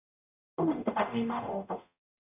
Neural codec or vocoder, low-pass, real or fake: codec, 44.1 kHz, 0.9 kbps, DAC; 3.6 kHz; fake